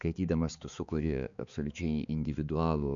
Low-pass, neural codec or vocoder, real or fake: 7.2 kHz; codec, 16 kHz, 4 kbps, X-Codec, HuBERT features, trained on balanced general audio; fake